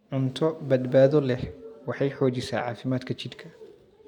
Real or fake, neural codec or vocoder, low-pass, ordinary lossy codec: real; none; 19.8 kHz; none